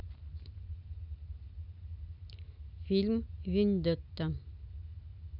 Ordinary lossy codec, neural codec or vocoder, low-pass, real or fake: none; none; 5.4 kHz; real